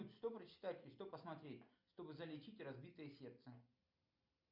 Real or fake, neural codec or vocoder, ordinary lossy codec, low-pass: real; none; MP3, 48 kbps; 5.4 kHz